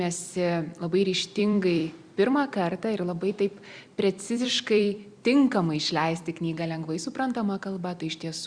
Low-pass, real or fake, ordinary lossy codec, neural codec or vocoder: 9.9 kHz; fake; Opus, 64 kbps; vocoder, 44.1 kHz, 128 mel bands every 256 samples, BigVGAN v2